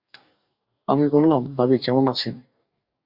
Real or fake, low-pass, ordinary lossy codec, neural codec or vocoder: fake; 5.4 kHz; Opus, 64 kbps; codec, 44.1 kHz, 2.6 kbps, DAC